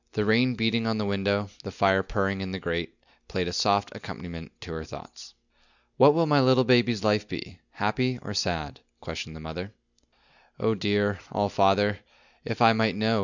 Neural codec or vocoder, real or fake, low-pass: none; real; 7.2 kHz